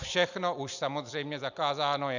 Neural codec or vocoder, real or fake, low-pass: none; real; 7.2 kHz